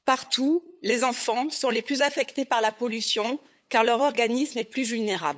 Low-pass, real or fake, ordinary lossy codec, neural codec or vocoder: none; fake; none; codec, 16 kHz, 8 kbps, FunCodec, trained on LibriTTS, 25 frames a second